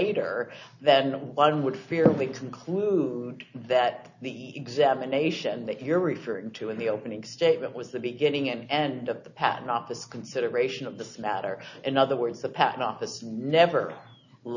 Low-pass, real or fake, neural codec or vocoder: 7.2 kHz; real; none